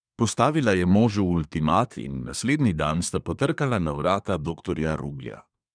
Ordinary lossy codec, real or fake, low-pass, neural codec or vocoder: none; fake; 9.9 kHz; codec, 24 kHz, 1 kbps, SNAC